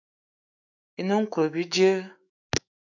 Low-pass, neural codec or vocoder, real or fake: 7.2 kHz; autoencoder, 48 kHz, 128 numbers a frame, DAC-VAE, trained on Japanese speech; fake